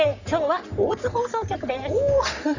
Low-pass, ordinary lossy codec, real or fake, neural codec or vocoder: 7.2 kHz; none; fake; codec, 44.1 kHz, 3.4 kbps, Pupu-Codec